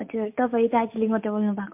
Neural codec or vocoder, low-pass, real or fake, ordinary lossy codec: none; 3.6 kHz; real; MP3, 32 kbps